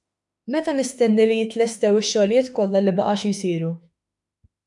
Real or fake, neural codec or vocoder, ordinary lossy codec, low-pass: fake; autoencoder, 48 kHz, 32 numbers a frame, DAC-VAE, trained on Japanese speech; MP3, 96 kbps; 10.8 kHz